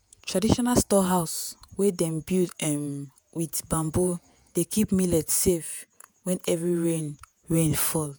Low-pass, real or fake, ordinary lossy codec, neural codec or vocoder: none; fake; none; vocoder, 48 kHz, 128 mel bands, Vocos